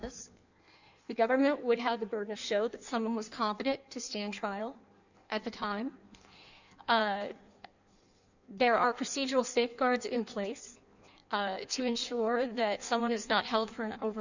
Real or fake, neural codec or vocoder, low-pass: fake; codec, 16 kHz in and 24 kHz out, 1.1 kbps, FireRedTTS-2 codec; 7.2 kHz